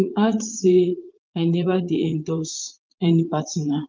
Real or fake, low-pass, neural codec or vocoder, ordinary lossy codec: fake; 7.2 kHz; vocoder, 44.1 kHz, 80 mel bands, Vocos; Opus, 32 kbps